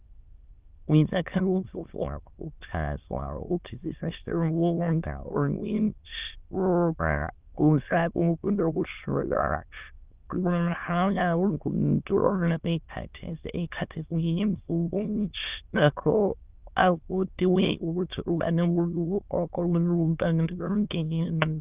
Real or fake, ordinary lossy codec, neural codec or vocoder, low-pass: fake; Opus, 24 kbps; autoencoder, 22.05 kHz, a latent of 192 numbers a frame, VITS, trained on many speakers; 3.6 kHz